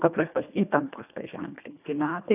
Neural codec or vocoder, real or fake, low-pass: codec, 24 kHz, 1.5 kbps, HILCodec; fake; 3.6 kHz